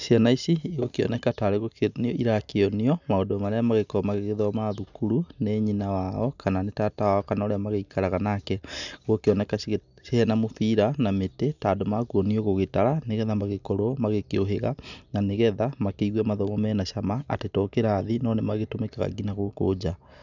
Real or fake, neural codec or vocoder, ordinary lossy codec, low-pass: real; none; none; 7.2 kHz